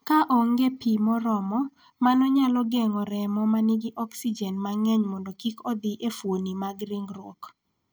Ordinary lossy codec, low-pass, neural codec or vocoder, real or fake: none; none; none; real